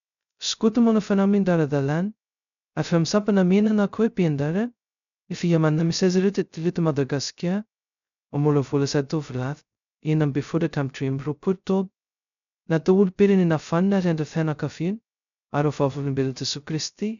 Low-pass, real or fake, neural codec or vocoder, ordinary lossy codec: 7.2 kHz; fake; codec, 16 kHz, 0.2 kbps, FocalCodec; MP3, 96 kbps